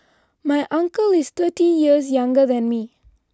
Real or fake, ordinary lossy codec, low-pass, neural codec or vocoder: real; none; none; none